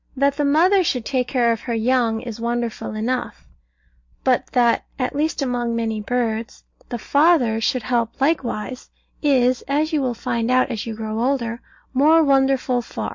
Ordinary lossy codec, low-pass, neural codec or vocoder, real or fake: MP3, 48 kbps; 7.2 kHz; none; real